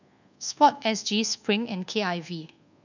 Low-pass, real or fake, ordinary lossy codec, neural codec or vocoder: 7.2 kHz; fake; none; codec, 24 kHz, 1.2 kbps, DualCodec